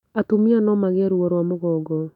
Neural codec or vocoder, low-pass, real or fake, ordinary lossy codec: autoencoder, 48 kHz, 128 numbers a frame, DAC-VAE, trained on Japanese speech; 19.8 kHz; fake; none